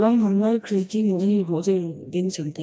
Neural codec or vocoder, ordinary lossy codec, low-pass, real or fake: codec, 16 kHz, 1 kbps, FreqCodec, smaller model; none; none; fake